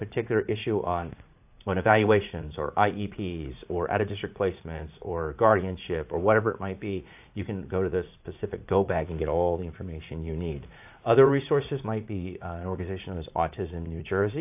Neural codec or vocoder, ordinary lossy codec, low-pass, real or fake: vocoder, 44.1 kHz, 80 mel bands, Vocos; AAC, 32 kbps; 3.6 kHz; fake